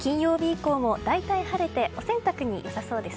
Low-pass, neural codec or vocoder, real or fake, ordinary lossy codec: none; none; real; none